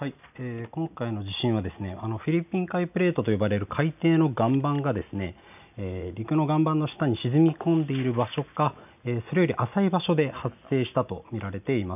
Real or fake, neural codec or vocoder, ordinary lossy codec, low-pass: real; none; none; 3.6 kHz